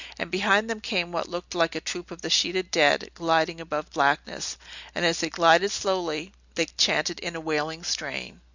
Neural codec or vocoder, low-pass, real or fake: none; 7.2 kHz; real